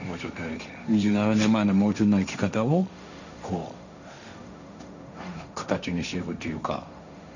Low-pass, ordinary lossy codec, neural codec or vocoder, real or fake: 7.2 kHz; none; codec, 16 kHz, 1.1 kbps, Voila-Tokenizer; fake